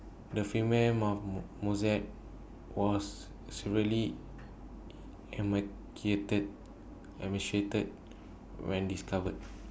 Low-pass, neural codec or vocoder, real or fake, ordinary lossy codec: none; none; real; none